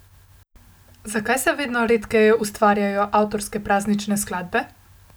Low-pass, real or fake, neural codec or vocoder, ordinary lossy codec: none; real; none; none